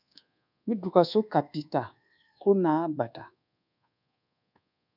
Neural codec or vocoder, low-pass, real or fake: codec, 24 kHz, 1.2 kbps, DualCodec; 5.4 kHz; fake